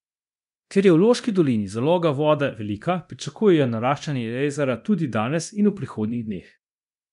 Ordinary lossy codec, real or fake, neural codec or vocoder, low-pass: none; fake; codec, 24 kHz, 0.9 kbps, DualCodec; 10.8 kHz